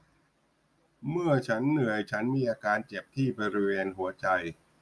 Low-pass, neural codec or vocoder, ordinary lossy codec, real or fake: none; none; none; real